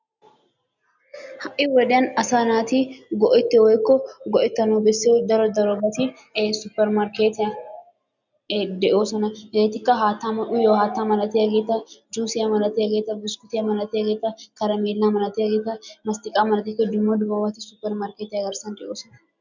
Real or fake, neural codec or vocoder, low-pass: real; none; 7.2 kHz